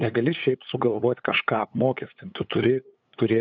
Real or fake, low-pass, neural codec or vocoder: fake; 7.2 kHz; codec, 16 kHz, 8 kbps, FunCodec, trained on LibriTTS, 25 frames a second